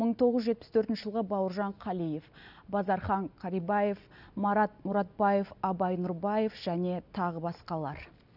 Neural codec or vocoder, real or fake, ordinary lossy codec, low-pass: none; real; none; 5.4 kHz